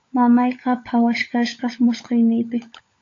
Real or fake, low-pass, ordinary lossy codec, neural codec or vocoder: fake; 7.2 kHz; AAC, 48 kbps; codec, 16 kHz, 16 kbps, FunCodec, trained on LibriTTS, 50 frames a second